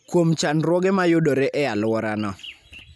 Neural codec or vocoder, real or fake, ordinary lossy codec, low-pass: none; real; none; none